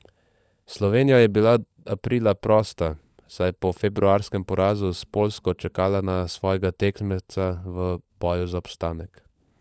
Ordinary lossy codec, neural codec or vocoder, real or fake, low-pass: none; codec, 16 kHz, 16 kbps, FunCodec, trained on LibriTTS, 50 frames a second; fake; none